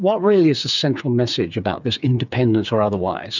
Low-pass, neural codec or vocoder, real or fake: 7.2 kHz; codec, 16 kHz, 8 kbps, FreqCodec, smaller model; fake